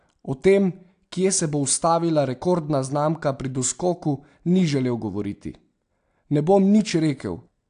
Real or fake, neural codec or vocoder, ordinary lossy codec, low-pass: real; none; AAC, 64 kbps; 9.9 kHz